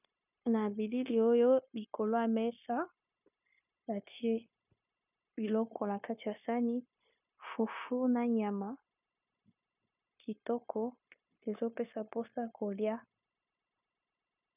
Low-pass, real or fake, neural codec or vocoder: 3.6 kHz; fake; codec, 16 kHz, 0.9 kbps, LongCat-Audio-Codec